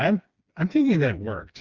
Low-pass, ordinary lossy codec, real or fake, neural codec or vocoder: 7.2 kHz; Opus, 64 kbps; fake; codec, 16 kHz, 2 kbps, FreqCodec, smaller model